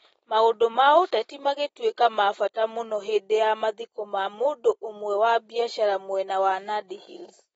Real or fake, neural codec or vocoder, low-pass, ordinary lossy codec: real; none; 19.8 kHz; AAC, 24 kbps